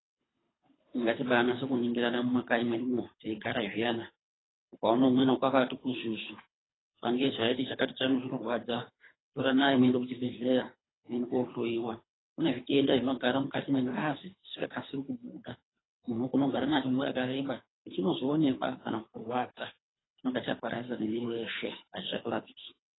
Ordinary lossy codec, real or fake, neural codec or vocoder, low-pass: AAC, 16 kbps; fake; codec, 24 kHz, 3 kbps, HILCodec; 7.2 kHz